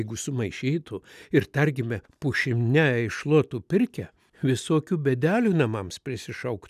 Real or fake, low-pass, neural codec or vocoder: real; 14.4 kHz; none